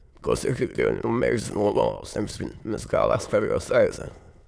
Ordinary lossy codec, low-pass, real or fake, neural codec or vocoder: none; none; fake; autoencoder, 22.05 kHz, a latent of 192 numbers a frame, VITS, trained on many speakers